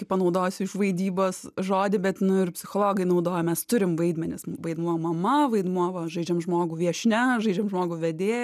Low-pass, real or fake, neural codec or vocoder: 14.4 kHz; real; none